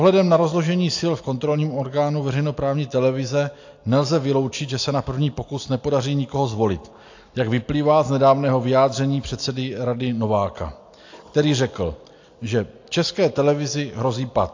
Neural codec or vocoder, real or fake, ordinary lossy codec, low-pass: none; real; AAC, 48 kbps; 7.2 kHz